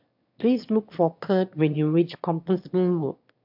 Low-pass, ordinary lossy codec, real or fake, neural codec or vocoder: 5.4 kHz; MP3, 48 kbps; fake; autoencoder, 22.05 kHz, a latent of 192 numbers a frame, VITS, trained on one speaker